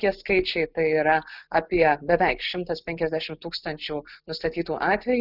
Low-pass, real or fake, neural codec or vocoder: 5.4 kHz; real; none